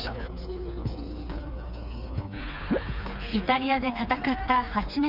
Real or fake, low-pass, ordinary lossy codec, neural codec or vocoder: fake; 5.4 kHz; none; codec, 16 kHz, 4 kbps, FreqCodec, smaller model